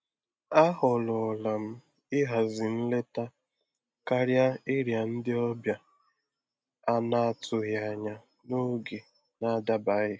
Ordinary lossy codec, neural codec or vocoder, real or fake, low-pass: none; none; real; none